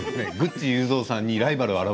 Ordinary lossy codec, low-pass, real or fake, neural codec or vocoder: none; none; real; none